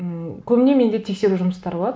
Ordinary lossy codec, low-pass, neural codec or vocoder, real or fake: none; none; none; real